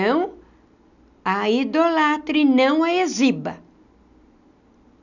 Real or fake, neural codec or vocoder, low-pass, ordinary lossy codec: real; none; 7.2 kHz; none